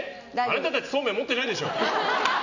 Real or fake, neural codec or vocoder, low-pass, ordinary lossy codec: real; none; 7.2 kHz; none